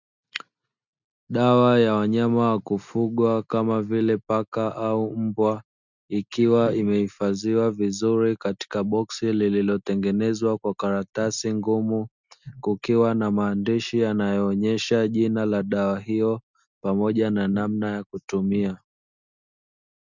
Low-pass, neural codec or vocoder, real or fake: 7.2 kHz; none; real